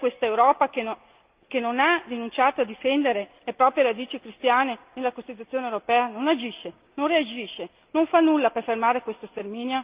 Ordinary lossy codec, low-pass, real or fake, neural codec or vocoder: Opus, 24 kbps; 3.6 kHz; real; none